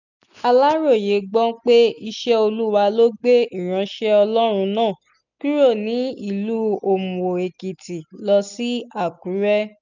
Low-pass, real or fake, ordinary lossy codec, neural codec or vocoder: 7.2 kHz; real; none; none